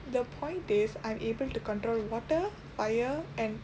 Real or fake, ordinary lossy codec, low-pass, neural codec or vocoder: real; none; none; none